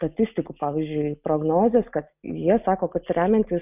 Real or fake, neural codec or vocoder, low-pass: real; none; 3.6 kHz